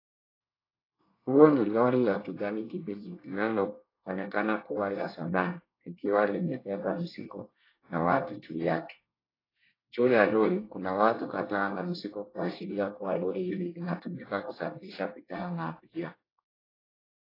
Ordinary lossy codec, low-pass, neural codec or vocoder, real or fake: AAC, 24 kbps; 5.4 kHz; codec, 24 kHz, 1 kbps, SNAC; fake